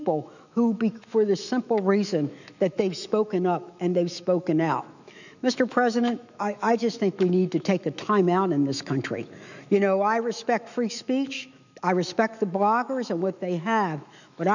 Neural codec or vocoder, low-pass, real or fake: autoencoder, 48 kHz, 128 numbers a frame, DAC-VAE, trained on Japanese speech; 7.2 kHz; fake